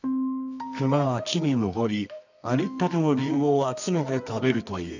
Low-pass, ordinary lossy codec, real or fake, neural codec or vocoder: 7.2 kHz; none; fake; codec, 24 kHz, 0.9 kbps, WavTokenizer, medium music audio release